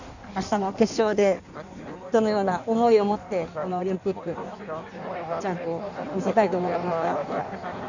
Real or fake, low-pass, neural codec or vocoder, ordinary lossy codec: fake; 7.2 kHz; codec, 16 kHz in and 24 kHz out, 1.1 kbps, FireRedTTS-2 codec; none